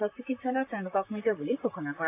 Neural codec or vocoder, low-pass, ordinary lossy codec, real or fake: vocoder, 44.1 kHz, 128 mel bands, Pupu-Vocoder; 3.6 kHz; AAC, 24 kbps; fake